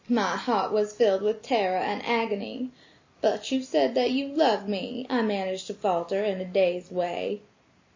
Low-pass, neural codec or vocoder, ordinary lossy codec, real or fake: 7.2 kHz; none; MP3, 32 kbps; real